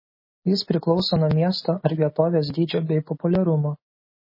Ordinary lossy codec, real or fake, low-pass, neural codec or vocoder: MP3, 24 kbps; real; 5.4 kHz; none